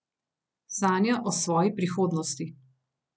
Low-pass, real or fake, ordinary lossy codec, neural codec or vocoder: none; real; none; none